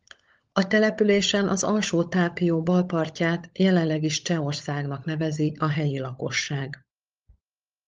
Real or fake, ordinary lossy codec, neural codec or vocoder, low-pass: fake; Opus, 24 kbps; codec, 16 kHz, 16 kbps, FunCodec, trained on LibriTTS, 50 frames a second; 7.2 kHz